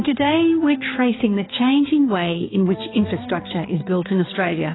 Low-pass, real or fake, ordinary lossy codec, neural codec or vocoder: 7.2 kHz; fake; AAC, 16 kbps; codec, 16 kHz, 4 kbps, X-Codec, HuBERT features, trained on balanced general audio